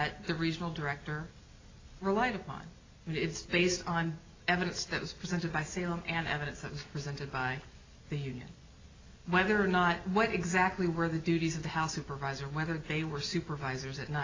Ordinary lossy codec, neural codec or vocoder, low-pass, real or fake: AAC, 32 kbps; none; 7.2 kHz; real